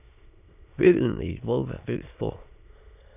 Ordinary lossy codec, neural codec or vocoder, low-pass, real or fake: none; autoencoder, 22.05 kHz, a latent of 192 numbers a frame, VITS, trained on many speakers; 3.6 kHz; fake